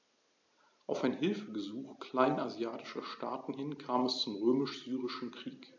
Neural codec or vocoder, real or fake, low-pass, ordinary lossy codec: none; real; 7.2 kHz; AAC, 48 kbps